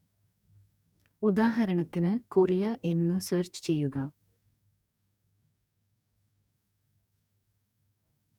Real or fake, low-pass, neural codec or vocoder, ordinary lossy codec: fake; 19.8 kHz; codec, 44.1 kHz, 2.6 kbps, DAC; none